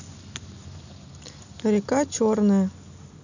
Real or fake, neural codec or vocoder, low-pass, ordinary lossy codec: real; none; 7.2 kHz; none